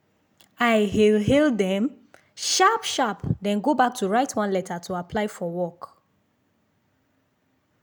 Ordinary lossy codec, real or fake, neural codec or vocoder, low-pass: none; real; none; none